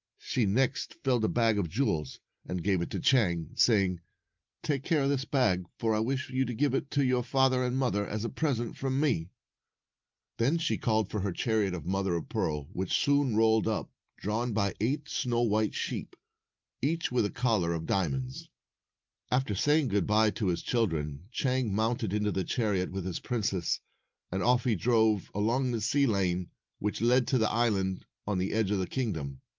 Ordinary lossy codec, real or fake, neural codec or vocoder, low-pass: Opus, 24 kbps; real; none; 7.2 kHz